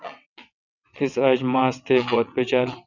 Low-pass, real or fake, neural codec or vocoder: 7.2 kHz; fake; vocoder, 22.05 kHz, 80 mel bands, WaveNeXt